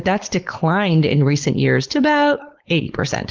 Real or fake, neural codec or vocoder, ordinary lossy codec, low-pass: fake; codec, 16 kHz, 4.8 kbps, FACodec; Opus, 16 kbps; 7.2 kHz